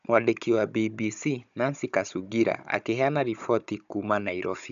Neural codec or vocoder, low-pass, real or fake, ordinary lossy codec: codec, 16 kHz, 16 kbps, FunCodec, trained on Chinese and English, 50 frames a second; 7.2 kHz; fake; AAC, 96 kbps